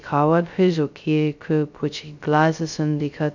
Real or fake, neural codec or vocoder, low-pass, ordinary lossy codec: fake; codec, 16 kHz, 0.2 kbps, FocalCodec; 7.2 kHz; none